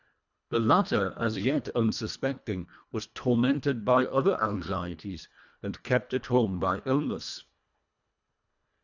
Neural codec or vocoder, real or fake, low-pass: codec, 24 kHz, 1.5 kbps, HILCodec; fake; 7.2 kHz